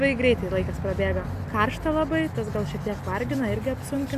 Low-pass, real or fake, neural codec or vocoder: 14.4 kHz; real; none